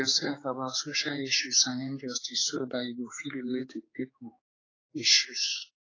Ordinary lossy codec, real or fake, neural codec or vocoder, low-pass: AAC, 32 kbps; fake; codec, 16 kHz, 4 kbps, X-Codec, HuBERT features, trained on general audio; 7.2 kHz